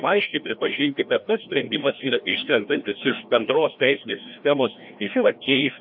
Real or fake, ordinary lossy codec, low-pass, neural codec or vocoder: fake; AAC, 48 kbps; 5.4 kHz; codec, 16 kHz, 1 kbps, FreqCodec, larger model